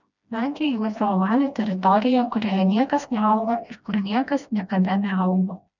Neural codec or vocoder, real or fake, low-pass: codec, 16 kHz, 1 kbps, FreqCodec, smaller model; fake; 7.2 kHz